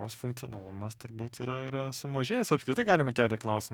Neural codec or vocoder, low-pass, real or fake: codec, 44.1 kHz, 2.6 kbps, DAC; 19.8 kHz; fake